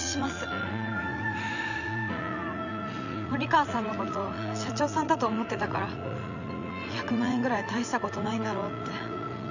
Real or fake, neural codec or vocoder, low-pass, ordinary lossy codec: fake; vocoder, 44.1 kHz, 80 mel bands, Vocos; 7.2 kHz; none